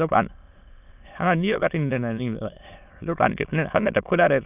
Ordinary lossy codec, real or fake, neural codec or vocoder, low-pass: none; fake; autoencoder, 22.05 kHz, a latent of 192 numbers a frame, VITS, trained on many speakers; 3.6 kHz